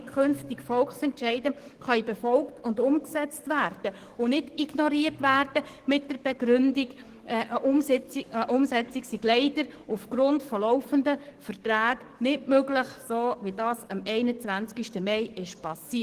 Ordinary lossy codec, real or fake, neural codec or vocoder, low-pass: Opus, 16 kbps; fake; codec, 44.1 kHz, 7.8 kbps, DAC; 14.4 kHz